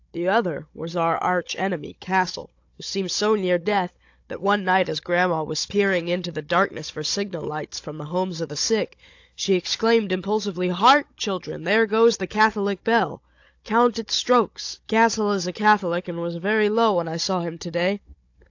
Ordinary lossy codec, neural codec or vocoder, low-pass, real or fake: AAC, 48 kbps; codec, 16 kHz, 16 kbps, FunCodec, trained on Chinese and English, 50 frames a second; 7.2 kHz; fake